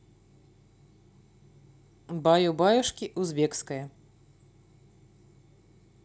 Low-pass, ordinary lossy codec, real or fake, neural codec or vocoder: none; none; real; none